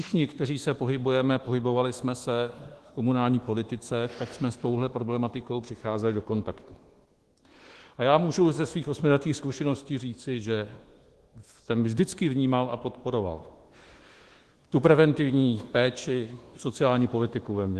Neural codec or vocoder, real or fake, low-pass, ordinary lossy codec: codec, 24 kHz, 1.2 kbps, DualCodec; fake; 10.8 kHz; Opus, 16 kbps